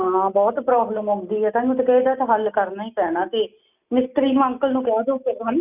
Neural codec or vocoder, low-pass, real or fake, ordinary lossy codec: none; 3.6 kHz; real; none